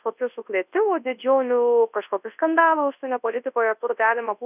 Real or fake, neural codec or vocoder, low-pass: fake; codec, 24 kHz, 0.9 kbps, WavTokenizer, large speech release; 3.6 kHz